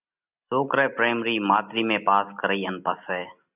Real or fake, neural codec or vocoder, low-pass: real; none; 3.6 kHz